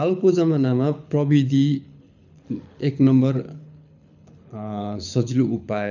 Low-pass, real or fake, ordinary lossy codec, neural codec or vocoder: 7.2 kHz; fake; none; codec, 24 kHz, 6 kbps, HILCodec